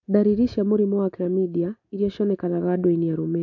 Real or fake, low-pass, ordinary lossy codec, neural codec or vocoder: real; 7.2 kHz; AAC, 48 kbps; none